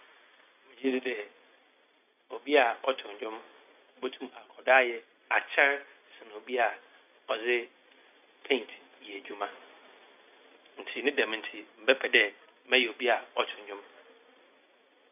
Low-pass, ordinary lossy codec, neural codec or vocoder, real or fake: 3.6 kHz; none; none; real